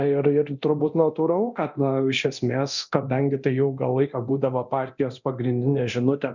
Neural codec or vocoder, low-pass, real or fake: codec, 24 kHz, 0.9 kbps, DualCodec; 7.2 kHz; fake